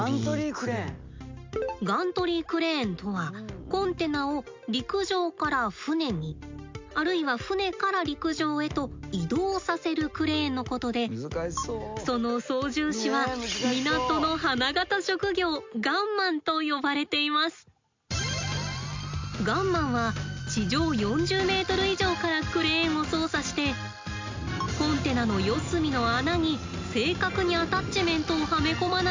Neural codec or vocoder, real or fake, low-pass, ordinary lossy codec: none; real; 7.2 kHz; MP3, 64 kbps